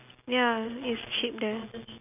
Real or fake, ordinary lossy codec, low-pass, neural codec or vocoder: real; none; 3.6 kHz; none